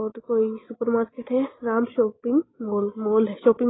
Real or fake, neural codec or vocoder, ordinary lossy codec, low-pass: real; none; AAC, 16 kbps; 7.2 kHz